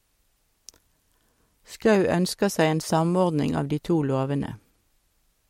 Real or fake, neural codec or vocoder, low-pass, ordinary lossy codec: real; none; 19.8 kHz; MP3, 64 kbps